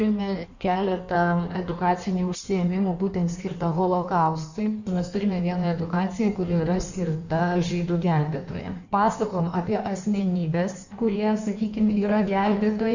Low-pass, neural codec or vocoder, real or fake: 7.2 kHz; codec, 16 kHz in and 24 kHz out, 1.1 kbps, FireRedTTS-2 codec; fake